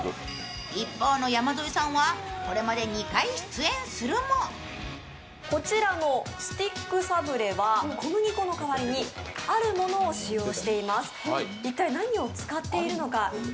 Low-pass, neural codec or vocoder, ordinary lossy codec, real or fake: none; none; none; real